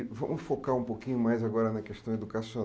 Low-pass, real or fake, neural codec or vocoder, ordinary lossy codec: none; real; none; none